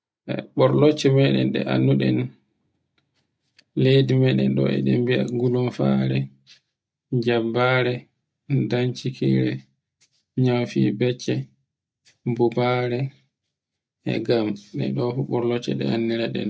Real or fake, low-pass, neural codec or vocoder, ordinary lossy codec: real; none; none; none